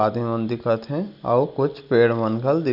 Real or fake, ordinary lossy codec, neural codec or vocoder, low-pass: real; none; none; 5.4 kHz